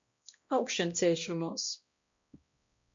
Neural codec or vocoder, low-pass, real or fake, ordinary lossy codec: codec, 16 kHz, 1 kbps, X-Codec, HuBERT features, trained on balanced general audio; 7.2 kHz; fake; MP3, 48 kbps